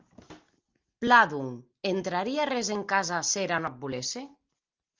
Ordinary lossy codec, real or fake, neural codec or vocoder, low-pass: Opus, 24 kbps; real; none; 7.2 kHz